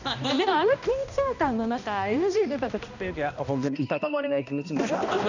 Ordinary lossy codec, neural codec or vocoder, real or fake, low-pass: none; codec, 16 kHz, 1 kbps, X-Codec, HuBERT features, trained on balanced general audio; fake; 7.2 kHz